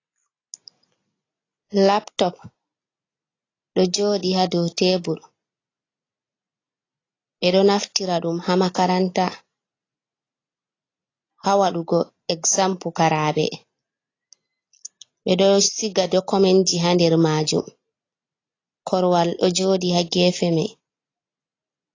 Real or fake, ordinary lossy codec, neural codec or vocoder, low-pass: real; AAC, 32 kbps; none; 7.2 kHz